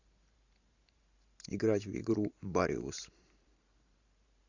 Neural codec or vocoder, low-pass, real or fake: vocoder, 44.1 kHz, 128 mel bands every 512 samples, BigVGAN v2; 7.2 kHz; fake